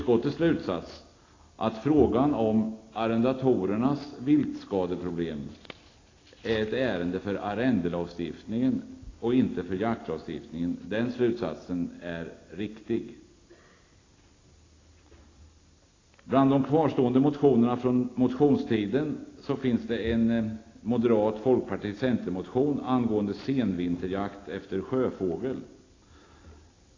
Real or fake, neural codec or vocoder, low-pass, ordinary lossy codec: real; none; 7.2 kHz; AAC, 32 kbps